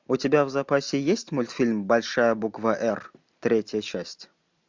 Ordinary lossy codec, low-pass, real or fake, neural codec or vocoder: MP3, 64 kbps; 7.2 kHz; real; none